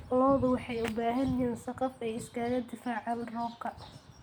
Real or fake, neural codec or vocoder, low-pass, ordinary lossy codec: real; none; none; none